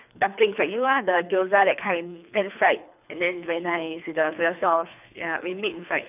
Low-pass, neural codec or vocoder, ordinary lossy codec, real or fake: 3.6 kHz; codec, 24 kHz, 3 kbps, HILCodec; none; fake